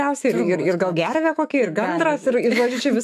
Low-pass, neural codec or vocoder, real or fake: 14.4 kHz; autoencoder, 48 kHz, 128 numbers a frame, DAC-VAE, trained on Japanese speech; fake